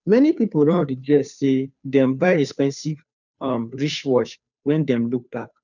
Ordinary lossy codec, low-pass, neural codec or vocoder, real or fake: none; 7.2 kHz; codec, 16 kHz, 2 kbps, FunCodec, trained on Chinese and English, 25 frames a second; fake